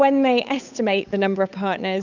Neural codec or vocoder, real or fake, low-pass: codec, 16 kHz, 8 kbps, FunCodec, trained on Chinese and English, 25 frames a second; fake; 7.2 kHz